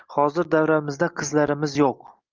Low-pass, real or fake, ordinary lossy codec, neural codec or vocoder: 7.2 kHz; real; Opus, 24 kbps; none